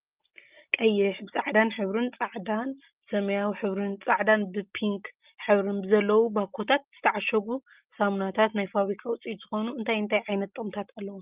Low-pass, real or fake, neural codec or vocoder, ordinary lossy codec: 3.6 kHz; real; none; Opus, 24 kbps